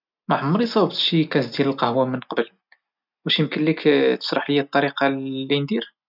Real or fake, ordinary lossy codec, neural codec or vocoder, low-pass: real; none; none; 5.4 kHz